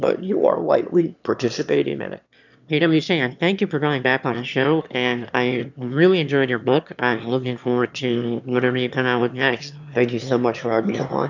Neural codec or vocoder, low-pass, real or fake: autoencoder, 22.05 kHz, a latent of 192 numbers a frame, VITS, trained on one speaker; 7.2 kHz; fake